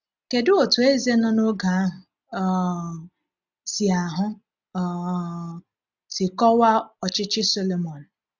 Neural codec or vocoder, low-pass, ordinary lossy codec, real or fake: none; 7.2 kHz; none; real